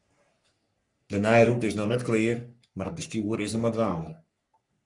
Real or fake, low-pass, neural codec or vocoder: fake; 10.8 kHz; codec, 44.1 kHz, 3.4 kbps, Pupu-Codec